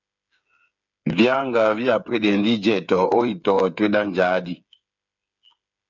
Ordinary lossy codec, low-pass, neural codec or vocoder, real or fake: MP3, 64 kbps; 7.2 kHz; codec, 16 kHz, 8 kbps, FreqCodec, smaller model; fake